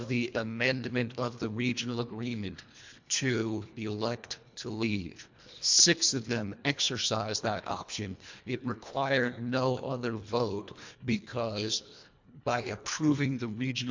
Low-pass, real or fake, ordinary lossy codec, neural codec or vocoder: 7.2 kHz; fake; MP3, 64 kbps; codec, 24 kHz, 1.5 kbps, HILCodec